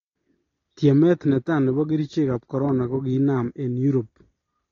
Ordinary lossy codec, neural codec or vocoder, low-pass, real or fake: AAC, 32 kbps; none; 7.2 kHz; real